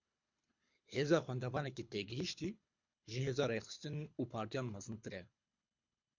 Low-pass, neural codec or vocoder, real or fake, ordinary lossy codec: 7.2 kHz; codec, 24 kHz, 6 kbps, HILCodec; fake; MP3, 64 kbps